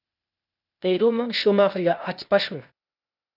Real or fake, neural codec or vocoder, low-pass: fake; codec, 16 kHz, 0.8 kbps, ZipCodec; 5.4 kHz